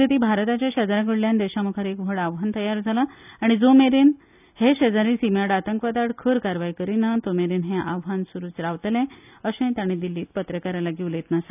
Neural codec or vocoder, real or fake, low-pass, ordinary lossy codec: none; real; 3.6 kHz; none